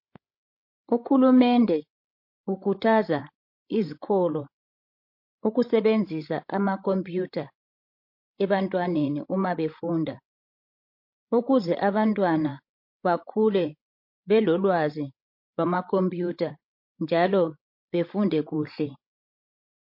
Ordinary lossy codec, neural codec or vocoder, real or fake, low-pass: MP3, 32 kbps; codec, 16 kHz, 16 kbps, FreqCodec, larger model; fake; 5.4 kHz